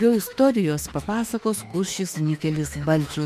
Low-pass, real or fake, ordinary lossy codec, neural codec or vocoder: 14.4 kHz; fake; AAC, 96 kbps; autoencoder, 48 kHz, 32 numbers a frame, DAC-VAE, trained on Japanese speech